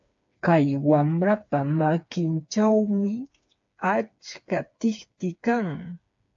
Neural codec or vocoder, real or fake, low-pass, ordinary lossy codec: codec, 16 kHz, 4 kbps, FreqCodec, smaller model; fake; 7.2 kHz; AAC, 64 kbps